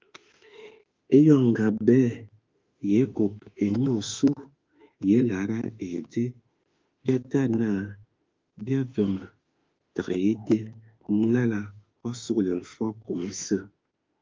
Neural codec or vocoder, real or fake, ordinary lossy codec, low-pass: autoencoder, 48 kHz, 32 numbers a frame, DAC-VAE, trained on Japanese speech; fake; Opus, 24 kbps; 7.2 kHz